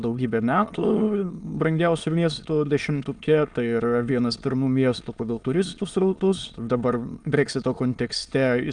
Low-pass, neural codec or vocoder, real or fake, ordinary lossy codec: 9.9 kHz; autoencoder, 22.05 kHz, a latent of 192 numbers a frame, VITS, trained on many speakers; fake; Opus, 32 kbps